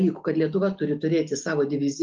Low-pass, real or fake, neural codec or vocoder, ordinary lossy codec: 7.2 kHz; real; none; Opus, 32 kbps